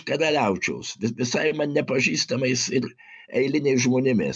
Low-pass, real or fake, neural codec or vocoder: 9.9 kHz; real; none